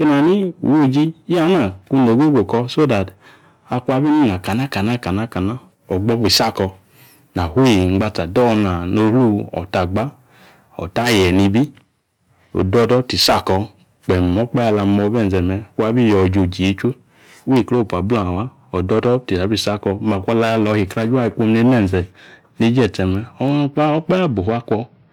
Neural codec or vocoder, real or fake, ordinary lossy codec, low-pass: none; real; none; 19.8 kHz